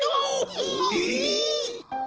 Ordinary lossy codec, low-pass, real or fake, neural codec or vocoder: Opus, 16 kbps; 7.2 kHz; real; none